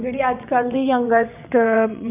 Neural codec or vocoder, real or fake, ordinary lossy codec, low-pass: vocoder, 22.05 kHz, 80 mel bands, WaveNeXt; fake; none; 3.6 kHz